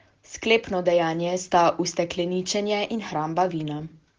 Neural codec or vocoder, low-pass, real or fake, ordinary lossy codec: none; 7.2 kHz; real; Opus, 16 kbps